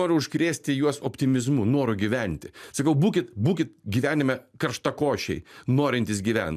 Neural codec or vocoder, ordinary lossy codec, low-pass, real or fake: none; MP3, 96 kbps; 14.4 kHz; real